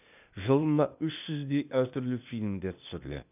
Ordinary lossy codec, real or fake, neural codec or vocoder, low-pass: none; fake; codec, 16 kHz, 0.8 kbps, ZipCodec; 3.6 kHz